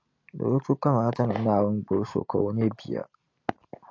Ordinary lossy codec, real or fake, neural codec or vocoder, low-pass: AAC, 48 kbps; real; none; 7.2 kHz